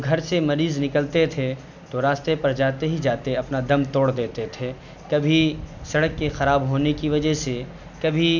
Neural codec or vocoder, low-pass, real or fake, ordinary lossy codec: none; 7.2 kHz; real; none